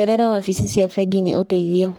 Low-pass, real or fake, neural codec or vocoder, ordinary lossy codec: none; fake; codec, 44.1 kHz, 1.7 kbps, Pupu-Codec; none